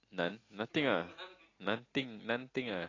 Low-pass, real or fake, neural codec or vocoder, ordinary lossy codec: 7.2 kHz; real; none; AAC, 32 kbps